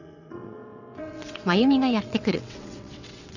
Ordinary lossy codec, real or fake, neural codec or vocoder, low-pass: none; fake; vocoder, 22.05 kHz, 80 mel bands, WaveNeXt; 7.2 kHz